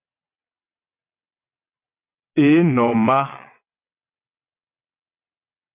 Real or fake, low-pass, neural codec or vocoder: fake; 3.6 kHz; vocoder, 22.05 kHz, 80 mel bands, WaveNeXt